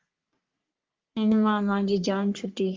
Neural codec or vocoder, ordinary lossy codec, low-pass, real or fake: codec, 44.1 kHz, 3.4 kbps, Pupu-Codec; Opus, 32 kbps; 7.2 kHz; fake